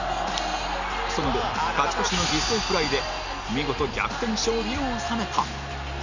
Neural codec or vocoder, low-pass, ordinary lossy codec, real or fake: none; 7.2 kHz; none; real